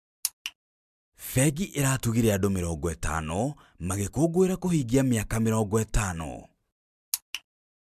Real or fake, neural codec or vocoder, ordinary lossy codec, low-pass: real; none; MP3, 96 kbps; 14.4 kHz